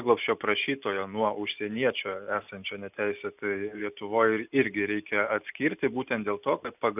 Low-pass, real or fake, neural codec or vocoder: 3.6 kHz; real; none